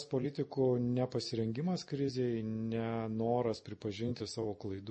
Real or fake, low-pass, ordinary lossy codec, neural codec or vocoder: real; 10.8 kHz; MP3, 32 kbps; none